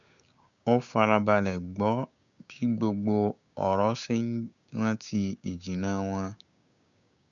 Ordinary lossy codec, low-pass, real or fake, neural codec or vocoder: none; 7.2 kHz; fake; codec, 16 kHz, 6 kbps, DAC